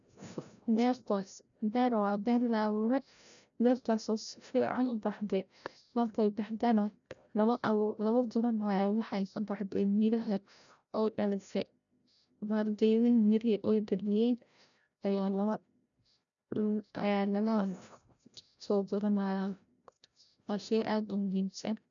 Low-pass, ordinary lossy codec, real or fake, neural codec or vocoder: 7.2 kHz; none; fake; codec, 16 kHz, 0.5 kbps, FreqCodec, larger model